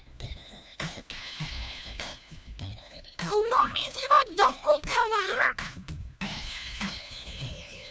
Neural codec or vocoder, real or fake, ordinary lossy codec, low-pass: codec, 16 kHz, 1 kbps, FunCodec, trained on LibriTTS, 50 frames a second; fake; none; none